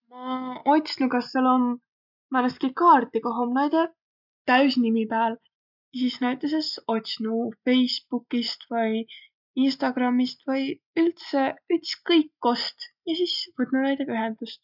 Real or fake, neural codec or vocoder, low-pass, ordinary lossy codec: real; none; 5.4 kHz; none